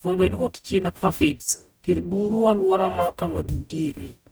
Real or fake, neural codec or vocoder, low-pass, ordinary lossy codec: fake; codec, 44.1 kHz, 0.9 kbps, DAC; none; none